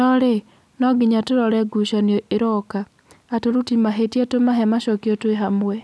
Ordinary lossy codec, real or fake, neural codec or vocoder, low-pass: none; real; none; none